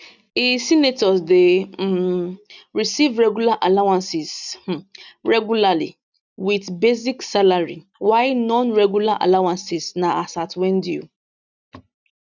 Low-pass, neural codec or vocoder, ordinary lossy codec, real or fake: 7.2 kHz; none; none; real